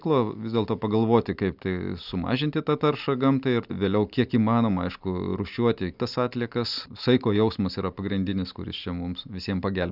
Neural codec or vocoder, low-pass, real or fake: none; 5.4 kHz; real